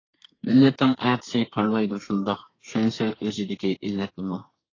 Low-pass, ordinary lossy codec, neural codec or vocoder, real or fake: 7.2 kHz; AAC, 32 kbps; codec, 32 kHz, 1.9 kbps, SNAC; fake